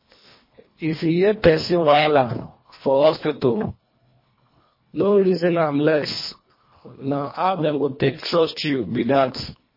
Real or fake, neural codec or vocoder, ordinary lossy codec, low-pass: fake; codec, 24 kHz, 1.5 kbps, HILCodec; MP3, 24 kbps; 5.4 kHz